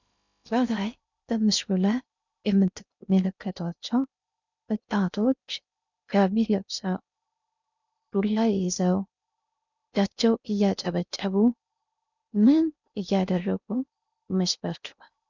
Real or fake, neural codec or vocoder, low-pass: fake; codec, 16 kHz in and 24 kHz out, 0.6 kbps, FocalCodec, streaming, 2048 codes; 7.2 kHz